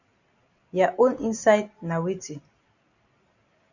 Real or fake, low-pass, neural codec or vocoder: real; 7.2 kHz; none